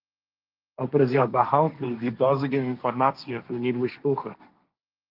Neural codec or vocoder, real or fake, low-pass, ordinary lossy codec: codec, 16 kHz, 1.1 kbps, Voila-Tokenizer; fake; 5.4 kHz; Opus, 32 kbps